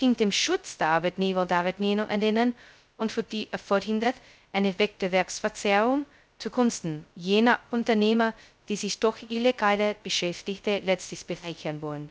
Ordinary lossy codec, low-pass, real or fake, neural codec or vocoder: none; none; fake; codec, 16 kHz, 0.2 kbps, FocalCodec